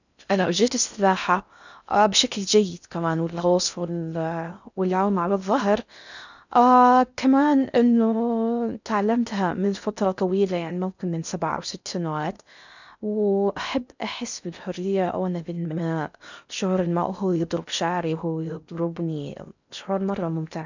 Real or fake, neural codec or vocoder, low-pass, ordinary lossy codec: fake; codec, 16 kHz in and 24 kHz out, 0.6 kbps, FocalCodec, streaming, 4096 codes; 7.2 kHz; none